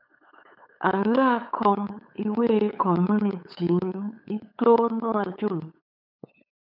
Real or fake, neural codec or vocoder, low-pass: fake; codec, 16 kHz, 8 kbps, FunCodec, trained on LibriTTS, 25 frames a second; 5.4 kHz